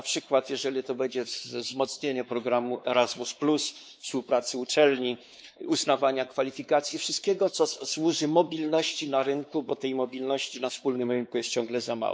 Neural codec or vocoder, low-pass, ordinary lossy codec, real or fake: codec, 16 kHz, 4 kbps, X-Codec, WavLM features, trained on Multilingual LibriSpeech; none; none; fake